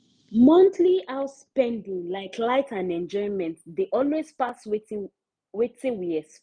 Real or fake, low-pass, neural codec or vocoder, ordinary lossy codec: real; 9.9 kHz; none; none